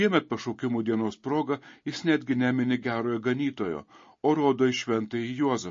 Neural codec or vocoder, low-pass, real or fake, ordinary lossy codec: none; 7.2 kHz; real; MP3, 32 kbps